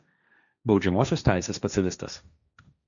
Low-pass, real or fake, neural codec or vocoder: 7.2 kHz; fake; codec, 16 kHz, 1.1 kbps, Voila-Tokenizer